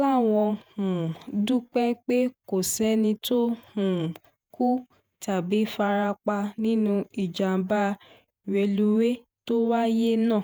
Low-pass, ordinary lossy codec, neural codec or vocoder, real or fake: none; none; vocoder, 48 kHz, 128 mel bands, Vocos; fake